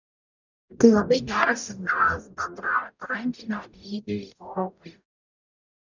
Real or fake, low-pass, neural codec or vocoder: fake; 7.2 kHz; codec, 44.1 kHz, 0.9 kbps, DAC